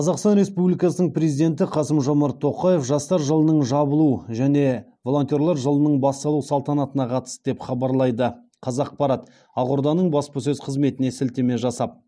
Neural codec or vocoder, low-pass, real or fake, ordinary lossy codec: none; none; real; none